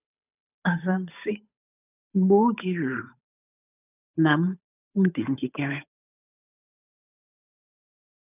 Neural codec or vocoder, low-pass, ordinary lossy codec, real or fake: codec, 16 kHz, 8 kbps, FunCodec, trained on Chinese and English, 25 frames a second; 3.6 kHz; none; fake